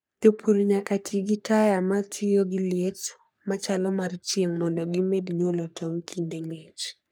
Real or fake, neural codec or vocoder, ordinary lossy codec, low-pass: fake; codec, 44.1 kHz, 3.4 kbps, Pupu-Codec; none; none